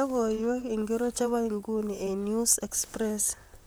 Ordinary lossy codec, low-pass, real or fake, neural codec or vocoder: none; none; fake; vocoder, 44.1 kHz, 128 mel bands, Pupu-Vocoder